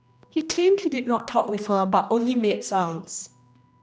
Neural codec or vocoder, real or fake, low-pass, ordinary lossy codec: codec, 16 kHz, 1 kbps, X-Codec, HuBERT features, trained on general audio; fake; none; none